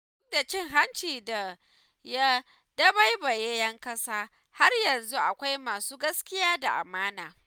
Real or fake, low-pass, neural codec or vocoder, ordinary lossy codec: real; none; none; none